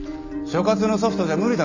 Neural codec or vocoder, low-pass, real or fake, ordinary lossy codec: none; 7.2 kHz; real; none